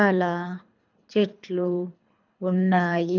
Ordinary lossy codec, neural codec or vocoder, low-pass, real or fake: none; codec, 24 kHz, 3 kbps, HILCodec; 7.2 kHz; fake